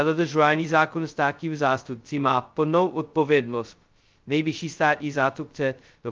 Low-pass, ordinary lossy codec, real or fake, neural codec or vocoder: 7.2 kHz; Opus, 24 kbps; fake; codec, 16 kHz, 0.2 kbps, FocalCodec